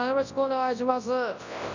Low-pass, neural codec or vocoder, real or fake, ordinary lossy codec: 7.2 kHz; codec, 24 kHz, 0.9 kbps, WavTokenizer, large speech release; fake; none